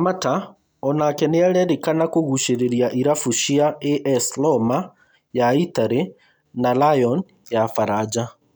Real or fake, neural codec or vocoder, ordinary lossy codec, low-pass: real; none; none; none